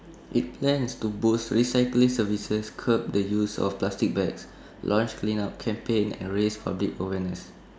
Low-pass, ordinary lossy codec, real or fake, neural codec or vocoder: none; none; real; none